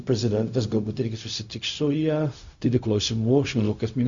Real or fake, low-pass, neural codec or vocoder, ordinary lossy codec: fake; 7.2 kHz; codec, 16 kHz, 0.4 kbps, LongCat-Audio-Codec; Opus, 64 kbps